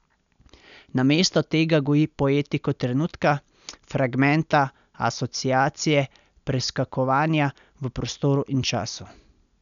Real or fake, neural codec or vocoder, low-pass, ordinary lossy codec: real; none; 7.2 kHz; none